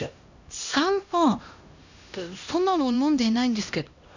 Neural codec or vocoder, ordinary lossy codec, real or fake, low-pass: codec, 16 kHz, 1 kbps, X-Codec, HuBERT features, trained on LibriSpeech; none; fake; 7.2 kHz